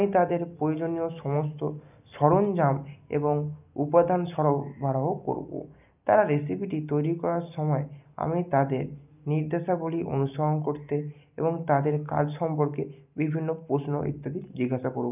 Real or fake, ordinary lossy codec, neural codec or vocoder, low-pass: real; none; none; 3.6 kHz